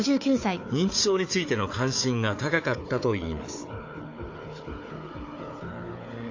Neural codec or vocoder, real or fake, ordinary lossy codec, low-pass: codec, 16 kHz, 4 kbps, FunCodec, trained on Chinese and English, 50 frames a second; fake; AAC, 48 kbps; 7.2 kHz